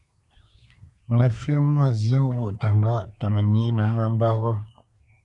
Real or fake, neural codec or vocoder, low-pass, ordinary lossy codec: fake; codec, 24 kHz, 1 kbps, SNAC; 10.8 kHz; MP3, 96 kbps